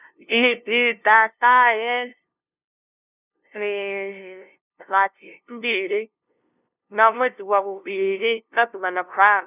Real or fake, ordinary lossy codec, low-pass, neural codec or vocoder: fake; none; 3.6 kHz; codec, 16 kHz, 0.5 kbps, FunCodec, trained on LibriTTS, 25 frames a second